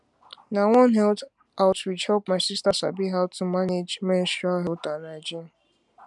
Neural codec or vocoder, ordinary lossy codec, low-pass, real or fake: none; MP3, 96 kbps; 10.8 kHz; real